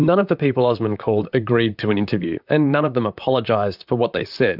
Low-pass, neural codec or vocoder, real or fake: 5.4 kHz; vocoder, 44.1 kHz, 128 mel bands, Pupu-Vocoder; fake